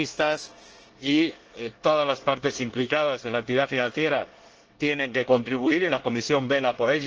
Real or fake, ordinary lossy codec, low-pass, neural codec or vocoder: fake; Opus, 16 kbps; 7.2 kHz; codec, 24 kHz, 1 kbps, SNAC